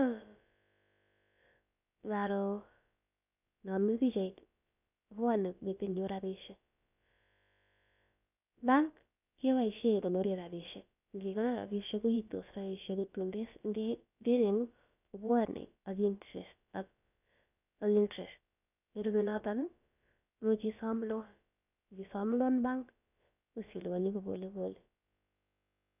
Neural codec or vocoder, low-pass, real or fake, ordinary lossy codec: codec, 16 kHz, about 1 kbps, DyCAST, with the encoder's durations; 3.6 kHz; fake; none